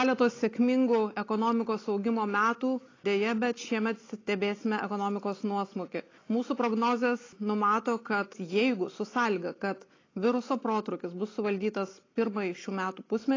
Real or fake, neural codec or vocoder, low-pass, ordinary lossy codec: real; none; 7.2 kHz; AAC, 32 kbps